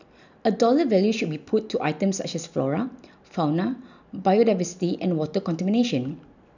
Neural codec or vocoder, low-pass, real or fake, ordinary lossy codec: none; 7.2 kHz; real; none